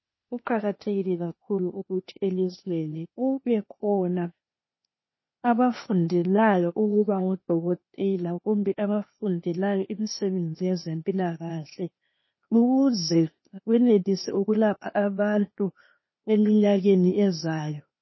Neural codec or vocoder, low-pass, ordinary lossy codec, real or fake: codec, 16 kHz, 0.8 kbps, ZipCodec; 7.2 kHz; MP3, 24 kbps; fake